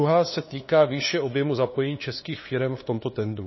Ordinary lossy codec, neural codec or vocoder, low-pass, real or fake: MP3, 24 kbps; codec, 16 kHz, 2 kbps, FunCodec, trained on LibriTTS, 25 frames a second; 7.2 kHz; fake